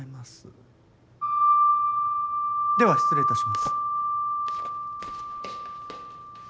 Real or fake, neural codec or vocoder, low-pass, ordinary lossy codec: real; none; none; none